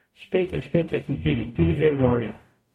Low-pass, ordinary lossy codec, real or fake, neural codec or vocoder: 19.8 kHz; MP3, 64 kbps; fake; codec, 44.1 kHz, 0.9 kbps, DAC